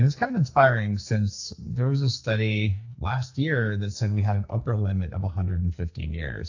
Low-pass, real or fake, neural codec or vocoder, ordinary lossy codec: 7.2 kHz; fake; codec, 44.1 kHz, 2.6 kbps, SNAC; AAC, 48 kbps